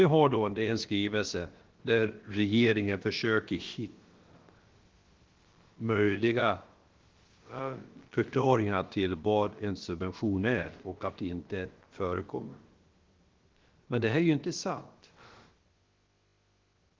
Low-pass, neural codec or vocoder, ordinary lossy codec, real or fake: 7.2 kHz; codec, 16 kHz, about 1 kbps, DyCAST, with the encoder's durations; Opus, 16 kbps; fake